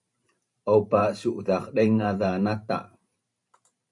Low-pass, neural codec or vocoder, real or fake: 10.8 kHz; vocoder, 44.1 kHz, 128 mel bands every 512 samples, BigVGAN v2; fake